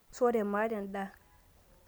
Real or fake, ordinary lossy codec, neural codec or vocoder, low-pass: real; none; none; none